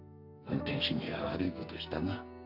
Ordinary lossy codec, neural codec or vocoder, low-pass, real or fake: none; codec, 44.1 kHz, 2.6 kbps, SNAC; 5.4 kHz; fake